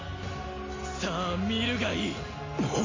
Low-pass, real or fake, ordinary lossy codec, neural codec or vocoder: 7.2 kHz; real; AAC, 32 kbps; none